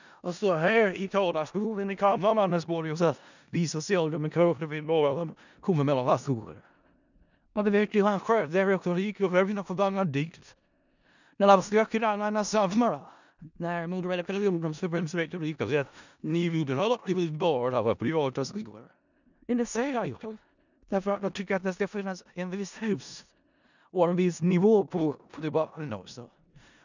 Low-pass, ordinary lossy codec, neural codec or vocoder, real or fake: 7.2 kHz; none; codec, 16 kHz in and 24 kHz out, 0.4 kbps, LongCat-Audio-Codec, four codebook decoder; fake